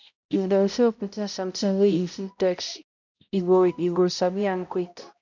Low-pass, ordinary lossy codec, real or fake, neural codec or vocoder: 7.2 kHz; none; fake; codec, 16 kHz, 0.5 kbps, X-Codec, HuBERT features, trained on balanced general audio